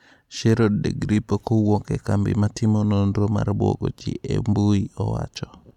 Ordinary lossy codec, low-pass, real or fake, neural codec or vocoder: none; 19.8 kHz; real; none